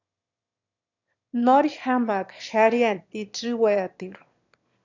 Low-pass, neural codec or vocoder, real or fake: 7.2 kHz; autoencoder, 22.05 kHz, a latent of 192 numbers a frame, VITS, trained on one speaker; fake